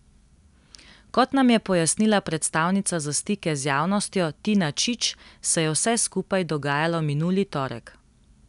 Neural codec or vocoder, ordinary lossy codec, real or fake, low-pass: none; none; real; 10.8 kHz